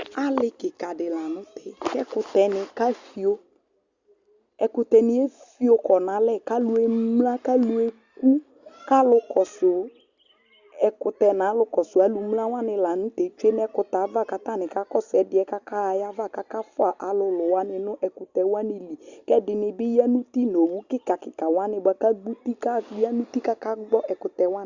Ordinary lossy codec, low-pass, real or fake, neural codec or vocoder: Opus, 64 kbps; 7.2 kHz; real; none